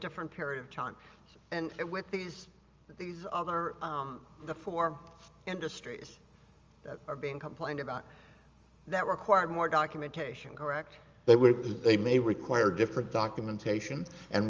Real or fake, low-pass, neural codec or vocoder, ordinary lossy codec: real; 7.2 kHz; none; Opus, 32 kbps